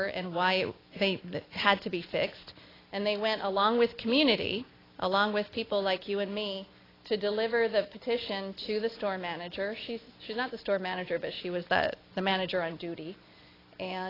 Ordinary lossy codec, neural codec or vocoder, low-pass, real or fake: AAC, 24 kbps; none; 5.4 kHz; real